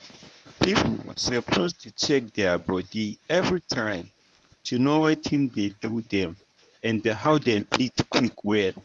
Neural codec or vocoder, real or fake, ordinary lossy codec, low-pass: codec, 24 kHz, 0.9 kbps, WavTokenizer, medium speech release version 1; fake; none; none